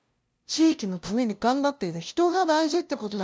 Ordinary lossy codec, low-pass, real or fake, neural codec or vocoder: none; none; fake; codec, 16 kHz, 0.5 kbps, FunCodec, trained on LibriTTS, 25 frames a second